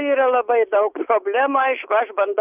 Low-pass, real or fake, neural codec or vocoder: 3.6 kHz; real; none